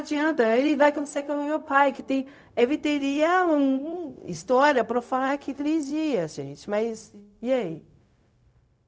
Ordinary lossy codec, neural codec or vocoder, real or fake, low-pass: none; codec, 16 kHz, 0.4 kbps, LongCat-Audio-Codec; fake; none